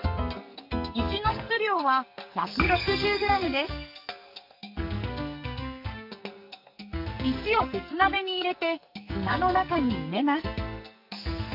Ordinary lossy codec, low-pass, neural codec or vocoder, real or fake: none; 5.4 kHz; codec, 44.1 kHz, 2.6 kbps, SNAC; fake